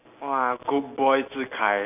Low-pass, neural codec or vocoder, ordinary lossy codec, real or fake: 3.6 kHz; none; AAC, 32 kbps; real